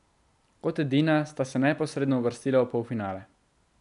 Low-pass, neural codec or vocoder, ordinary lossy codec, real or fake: 10.8 kHz; none; none; real